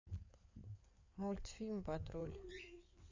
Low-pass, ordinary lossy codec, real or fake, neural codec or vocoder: 7.2 kHz; Opus, 64 kbps; fake; codec, 16 kHz in and 24 kHz out, 2.2 kbps, FireRedTTS-2 codec